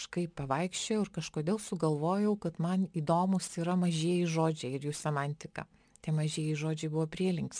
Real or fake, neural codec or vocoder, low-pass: real; none; 9.9 kHz